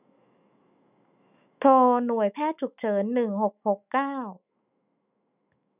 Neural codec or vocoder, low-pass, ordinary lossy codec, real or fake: autoencoder, 48 kHz, 128 numbers a frame, DAC-VAE, trained on Japanese speech; 3.6 kHz; none; fake